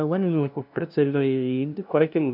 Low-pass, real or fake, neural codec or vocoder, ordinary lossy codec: 5.4 kHz; fake; codec, 16 kHz, 0.5 kbps, FunCodec, trained on LibriTTS, 25 frames a second; none